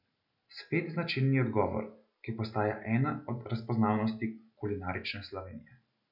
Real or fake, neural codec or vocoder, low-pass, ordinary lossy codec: real; none; 5.4 kHz; none